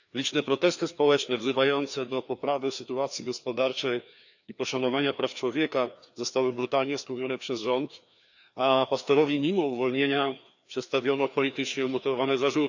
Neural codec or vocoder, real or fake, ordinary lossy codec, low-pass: codec, 16 kHz, 2 kbps, FreqCodec, larger model; fake; none; 7.2 kHz